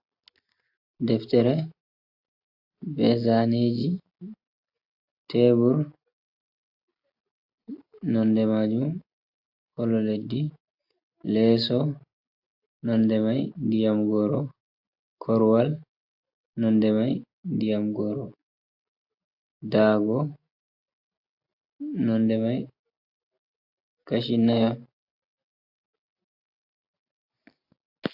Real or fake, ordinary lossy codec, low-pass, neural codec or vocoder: real; AAC, 48 kbps; 5.4 kHz; none